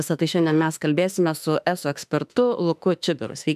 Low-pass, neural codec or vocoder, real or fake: 14.4 kHz; autoencoder, 48 kHz, 32 numbers a frame, DAC-VAE, trained on Japanese speech; fake